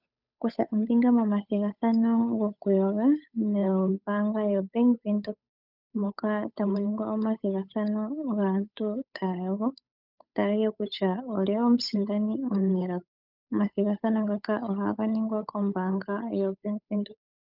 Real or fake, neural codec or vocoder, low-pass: fake; codec, 16 kHz, 8 kbps, FunCodec, trained on Chinese and English, 25 frames a second; 5.4 kHz